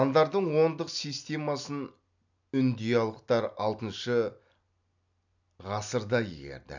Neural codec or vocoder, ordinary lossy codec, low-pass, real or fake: none; none; 7.2 kHz; real